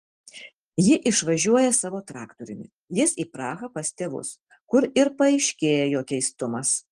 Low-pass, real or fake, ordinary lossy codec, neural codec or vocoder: 9.9 kHz; real; Opus, 24 kbps; none